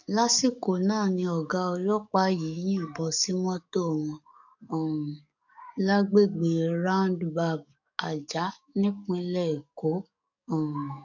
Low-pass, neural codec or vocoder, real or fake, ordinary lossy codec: 7.2 kHz; codec, 44.1 kHz, 7.8 kbps, DAC; fake; none